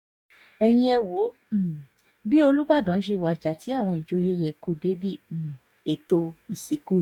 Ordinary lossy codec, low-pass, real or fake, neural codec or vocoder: none; 19.8 kHz; fake; codec, 44.1 kHz, 2.6 kbps, DAC